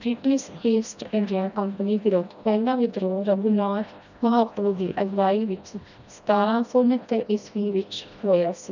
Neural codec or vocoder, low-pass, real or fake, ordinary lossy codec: codec, 16 kHz, 1 kbps, FreqCodec, smaller model; 7.2 kHz; fake; none